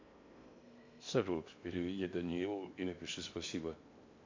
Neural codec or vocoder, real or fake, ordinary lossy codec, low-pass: codec, 16 kHz in and 24 kHz out, 0.8 kbps, FocalCodec, streaming, 65536 codes; fake; MP3, 48 kbps; 7.2 kHz